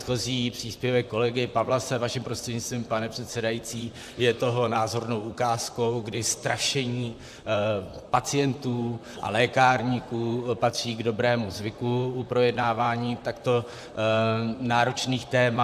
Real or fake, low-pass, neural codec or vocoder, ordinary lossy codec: fake; 14.4 kHz; vocoder, 44.1 kHz, 128 mel bands, Pupu-Vocoder; AAC, 96 kbps